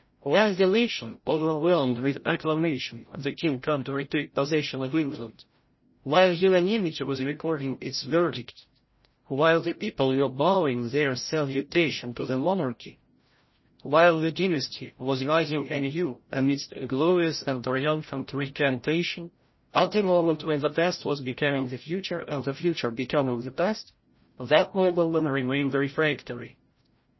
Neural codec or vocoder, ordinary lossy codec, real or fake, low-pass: codec, 16 kHz, 0.5 kbps, FreqCodec, larger model; MP3, 24 kbps; fake; 7.2 kHz